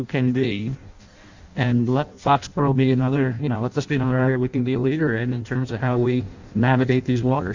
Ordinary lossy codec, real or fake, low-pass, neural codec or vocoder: Opus, 64 kbps; fake; 7.2 kHz; codec, 16 kHz in and 24 kHz out, 0.6 kbps, FireRedTTS-2 codec